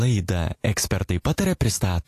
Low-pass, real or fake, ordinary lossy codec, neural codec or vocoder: 14.4 kHz; real; AAC, 64 kbps; none